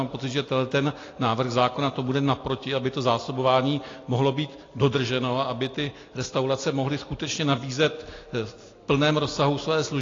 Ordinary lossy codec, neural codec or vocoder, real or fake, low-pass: AAC, 32 kbps; none; real; 7.2 kHz